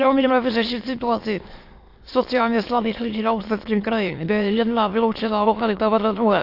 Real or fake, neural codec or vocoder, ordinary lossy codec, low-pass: fake; autoencoder, 22.05 kHz, a latent of 192 numbers a frame, VITS, trained on many speakers; AAC, 32 kbps; 5.4 kHz